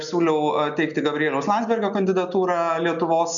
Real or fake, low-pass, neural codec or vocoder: real; 7.2 kHz; none